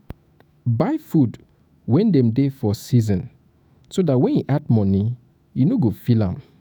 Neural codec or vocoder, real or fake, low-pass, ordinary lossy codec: autoencoder, 48 kHz, 128 numbers a frame, DAC-VAE, trained on Japanese speech; fake; none; none